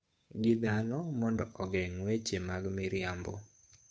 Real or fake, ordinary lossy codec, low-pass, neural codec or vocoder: fake; none; none; codec, 16 kHz, 8 kbps, FunCodec, trained on Chinese and English, 25 frames a second